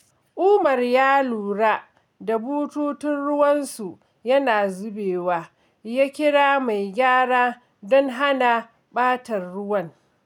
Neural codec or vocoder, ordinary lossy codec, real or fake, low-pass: none; none; real; 19.8 kHz